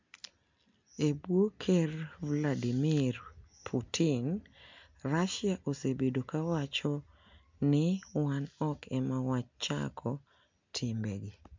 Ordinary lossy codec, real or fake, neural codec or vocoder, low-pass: MP3, 64 kbps; real; none; 7.2 kHz